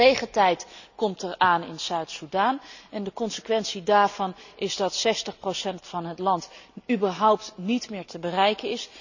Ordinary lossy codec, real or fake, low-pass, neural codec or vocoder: none; real; 7.2 kHz; none